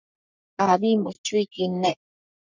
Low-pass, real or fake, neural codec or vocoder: 7.2 kHz; fake; codec, 44.1 kHz, 3.4 kbps, Pupu-Codec